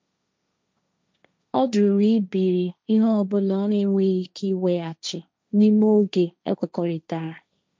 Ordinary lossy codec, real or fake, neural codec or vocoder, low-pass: none; fake; codec, 16 kHz, 1.1 kbps, Voila-Tokenizer; none